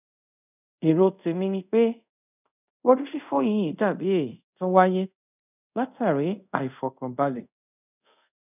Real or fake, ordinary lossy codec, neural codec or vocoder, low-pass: fake; none; codec, 24 kHz, 0.5 kbps, DualCodec; 3.6 kHz